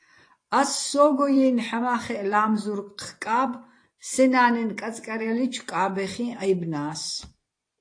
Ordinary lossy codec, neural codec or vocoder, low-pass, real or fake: AAC, 48 kbps; vocoder, 24 kHz, 100 mel bands, Vocos; 9.9 kHz; fake